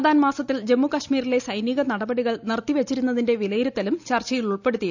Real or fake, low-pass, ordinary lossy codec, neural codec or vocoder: real; 7.2 kHz; none; none